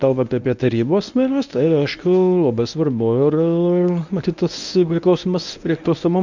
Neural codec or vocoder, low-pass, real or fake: codec, 24 kHz, 0.9 kbps, WavTokenizer, medium speech release version 1; 7.2 kHz; fake